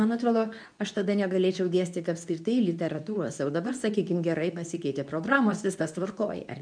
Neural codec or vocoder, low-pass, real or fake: codec, 24 kHz, 0.9 kbps, WavTokenizer, medium speech release version 2; 9.9 kHz; fake